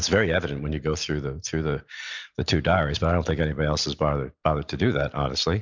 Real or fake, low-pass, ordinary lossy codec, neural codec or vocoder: real; 7.2 kHz; MP3, 64 kbps; none